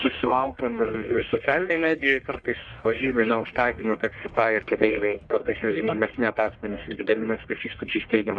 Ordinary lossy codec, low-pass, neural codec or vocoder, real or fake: Opus, 64 kbps; 9.9 kHz; codec, 44.1 kHz, 1.7 kbps, Pupu-Codec; fake